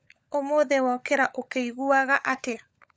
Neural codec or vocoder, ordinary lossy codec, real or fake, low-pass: codec, 16 kHz, 16 kbps, FunCodec, trained on LibriTTS, 50 frames a second; none; fake; none